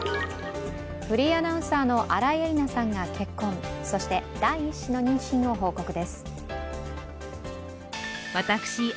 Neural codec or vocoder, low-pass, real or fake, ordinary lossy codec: none; none; real; none